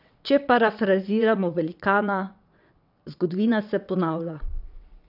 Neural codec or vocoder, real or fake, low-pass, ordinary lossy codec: vocoder, 22.05 kHz, 80 mel bands, WaveNeXt; fake; 5.4 kHz; none